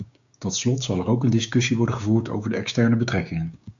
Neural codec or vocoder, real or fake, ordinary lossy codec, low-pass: codec, 16 kHz, 6 kbps, DAC; fake; AAC, 64 kbps; 7.2 kHz